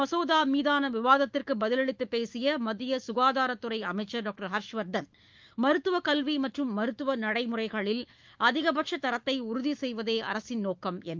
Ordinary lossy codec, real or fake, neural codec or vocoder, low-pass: Opus, 32 kbps; fake; autoencoder, 48 kHz, 128 numbers a frame, DAC-VAE, trained on Japanese speech; 7.2 kHz